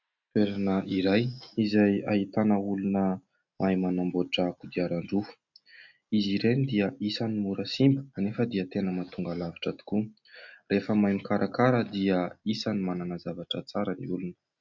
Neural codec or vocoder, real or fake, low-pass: none; real; 7.2 kHz